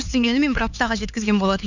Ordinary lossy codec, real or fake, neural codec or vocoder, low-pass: none; fake; codec, 16 kHz, 4 kbps, X-Codec, HuBERT features, trained on LibriSpeech; 7.2 kHz